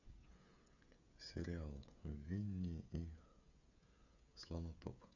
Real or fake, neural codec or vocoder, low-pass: fake; codec, 16 kHz, 16 kbps, FreqCodec, smaller model; 7.2 kHz